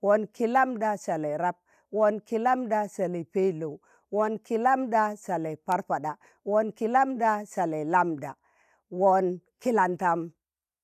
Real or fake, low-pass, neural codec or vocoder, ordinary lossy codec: real; 14.4 kHz; none; none